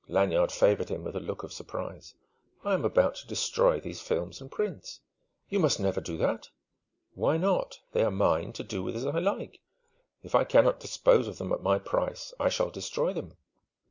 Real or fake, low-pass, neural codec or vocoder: real; 7.2 kHz; none